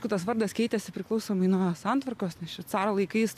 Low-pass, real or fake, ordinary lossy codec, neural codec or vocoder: 14.4 kHz; real; AAC, 96 kbps; none